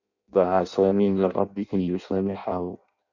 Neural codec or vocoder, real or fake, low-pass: codec, 16 kHz in and 24 kHz out, 0.6 kbps, FireRedTTS-2 codec; fake; 7.2 kHz